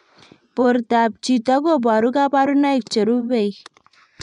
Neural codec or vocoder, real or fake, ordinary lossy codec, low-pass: vocoder, 24 kHz, 100 mel bands, Vocos; fake; none; 10.8 kHz